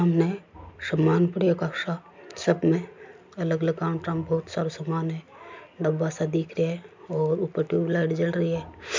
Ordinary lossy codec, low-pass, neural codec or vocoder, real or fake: MP3, 64 kbps; 7.2 kHz; none; real